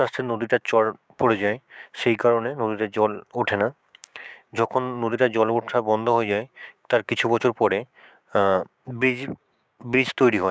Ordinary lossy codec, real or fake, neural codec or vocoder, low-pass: none; fake; codec, 16 kHz, 6 kbps, DAC; none